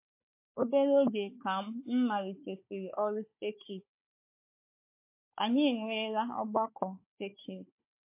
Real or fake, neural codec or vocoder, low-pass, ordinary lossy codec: fake; codec, 16 kHz, 4 kbps, FunCodec, trained on Chinese and English, 50 frames a second; 3.6 kHz; MP3, 24 kbps